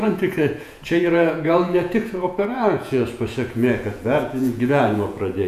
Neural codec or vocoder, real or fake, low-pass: vocoder, 48 kHz, 128 mel bands, Vocos; fake; 14.4 kHz